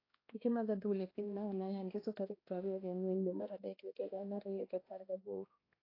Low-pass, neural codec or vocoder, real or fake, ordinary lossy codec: 5.4 kHz; codec, 16 kHz, 1 kbps, X-Codec, HuBERT features, trained on balanced general audio; fake; AAC, 24 kbps